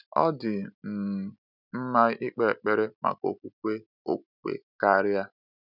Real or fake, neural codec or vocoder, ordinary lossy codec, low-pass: real; none; none; 5.4 kHz